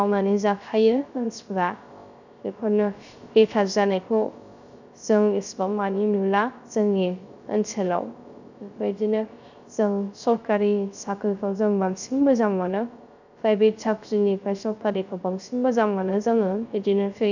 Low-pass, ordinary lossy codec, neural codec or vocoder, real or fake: 7.2 kHz; none; codec, 16 kHz, 0.3 kbps, FocalCodec; fake